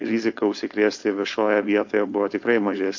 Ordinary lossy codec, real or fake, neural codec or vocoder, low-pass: MP3, 48 kbps; fake; codec, 16 kHz, 4.8 kbps, FACodec; 7.2 kHz